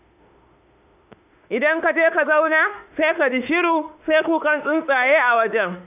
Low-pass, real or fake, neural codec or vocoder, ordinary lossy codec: 3.6 kHz; fake; autoencoder, 48 kHz, 32 numbers a frame, DAC-VAE, trained on Japanese speech; AAC, 32 kbps